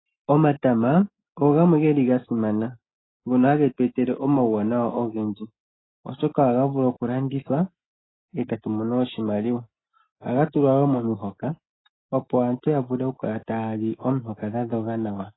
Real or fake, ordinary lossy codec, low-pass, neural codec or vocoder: real; AAC, 16 kbps; 7.2 kHz; none